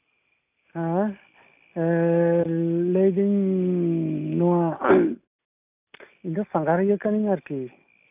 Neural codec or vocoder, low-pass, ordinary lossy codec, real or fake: none; 3.6 kHz; AAC, 32 kbps; real